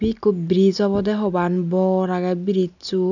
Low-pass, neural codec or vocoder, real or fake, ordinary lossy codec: 7.2 kHz; none; real; none